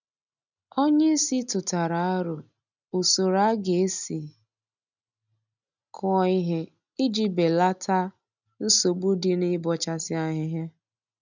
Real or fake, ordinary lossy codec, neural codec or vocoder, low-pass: real; none; none; 7.2 kHz